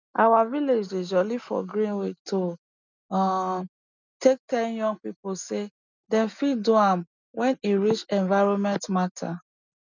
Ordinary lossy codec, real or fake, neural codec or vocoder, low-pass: none; real; none; 7.2 kHz